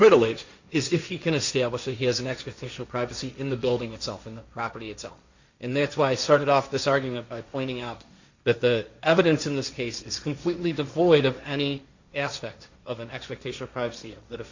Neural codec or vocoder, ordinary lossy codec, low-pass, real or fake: codec, 16 kHz, 1.1 kbps, Voila-Tokenizer; Opus, 64 kbps; 7.2 kHz; fake